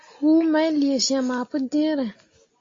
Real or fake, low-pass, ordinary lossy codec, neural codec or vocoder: real; 7.2 kHz; AAC, 32 kbps; none